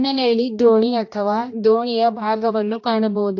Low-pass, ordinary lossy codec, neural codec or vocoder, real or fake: 7.2 kHz; AAC, 48 kbps; codec, 16 kHz, 1 kbps, X-Codec, HuBERT features, trained on general audio; fake